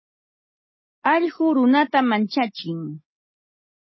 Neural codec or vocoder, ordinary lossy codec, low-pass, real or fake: none; MP3, 24 kbps; 7.2 kHz; real